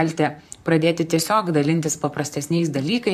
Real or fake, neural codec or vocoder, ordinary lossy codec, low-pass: fake; vocoder, 44.1 kHz, 128 mel bands, Pupu-Vocoder; AAC, 96 kbps; 14.4 kHz